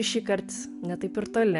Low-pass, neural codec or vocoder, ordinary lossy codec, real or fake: 10.8 kHz; none; AAC, 96 kbps; real